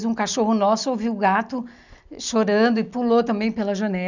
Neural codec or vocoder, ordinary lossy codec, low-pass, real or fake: none; none; 7.2 kHz; real